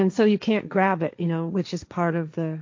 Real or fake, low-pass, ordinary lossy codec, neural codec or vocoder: fake; 7.2 kHz; MP3, 48 kbps; codec, 16 kHz, 1.1 kbps, Voila-Tokenizer